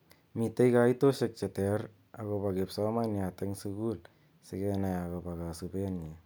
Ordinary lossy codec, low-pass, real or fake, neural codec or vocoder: none; none; real; none